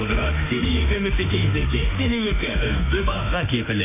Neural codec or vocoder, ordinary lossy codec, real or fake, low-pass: autoencoder, 48 kHz, 32 numbers a frame, DAC-VAE, trained on Japanese speech; none; fake; 3.6 kHz